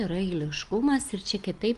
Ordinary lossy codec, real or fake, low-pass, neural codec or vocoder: Opus, 24 kbps; real; 10.8 kHz; none